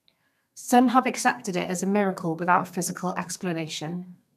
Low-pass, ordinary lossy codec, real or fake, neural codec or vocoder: 14.4 kHz; none; fake; codec, 32 kHz, 1.9 kbps, SNAC